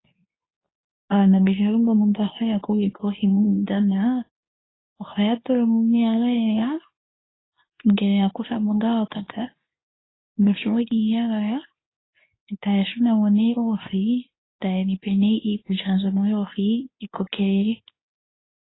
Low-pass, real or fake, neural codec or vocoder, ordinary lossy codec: 7.2 kHz; fake; codec, 24 kHz, 0.9 kbps, WavTokenizer, medium speech release version 2; AAC, 16 kbps